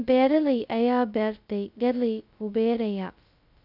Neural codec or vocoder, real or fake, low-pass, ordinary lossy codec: codec, 16 kHz, 0.2 kbps, FocalCodec; fake; 5.4 kHz; none